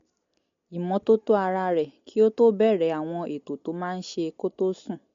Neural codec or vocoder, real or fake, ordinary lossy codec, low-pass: none; real; MP3, 48 kbps; 7.2 kHz